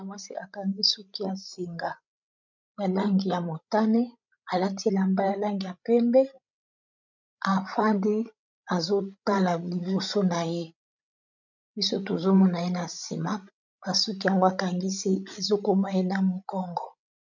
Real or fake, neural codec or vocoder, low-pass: fake; codec, 16 kHz, 16 kbps, FreqCodec, larger model; 7.2 kHz